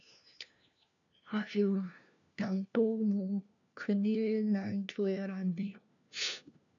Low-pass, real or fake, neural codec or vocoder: 7.2 kHz; fake; codec, 16 kHz, 1 kbps, FunCodec, trained on LibriTTS, 50 frames a second